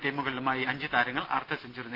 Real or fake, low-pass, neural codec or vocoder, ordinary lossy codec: real; 5.4 kHz; none; Opus, 32 kbps